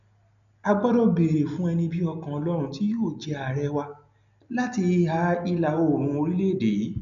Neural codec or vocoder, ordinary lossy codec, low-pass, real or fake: none; none; 7.2 kHz; real